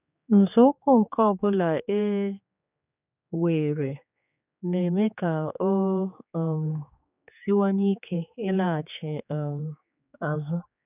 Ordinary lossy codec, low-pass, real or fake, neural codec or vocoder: none; 3.6 kHz; fake; codec, 16 kHz, 4 kbps, X-Codec, HuBERT features, trained on general audio